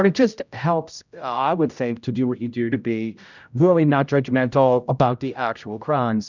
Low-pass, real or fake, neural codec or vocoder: 7.2 kHz; fake; codec, 16 kHz, 0.5 kbps, X-Codec, HuBERT features, trained on general audio